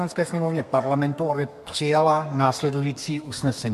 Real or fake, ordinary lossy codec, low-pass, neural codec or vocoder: fake; MP3, 64 kbps; 14.4 kHz; codec, 32 kHz, 1.9 kbps, SNAC